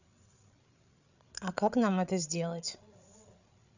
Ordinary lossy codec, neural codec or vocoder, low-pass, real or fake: none; codec, 16 kHz, 8 kbps, FreqCodec, larger model; 7.2 kHz; fake